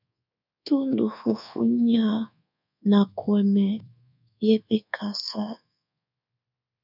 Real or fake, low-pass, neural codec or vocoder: fake; 5.4 kHz; codec, 24 kHz, 1.2 kbps, DualCodec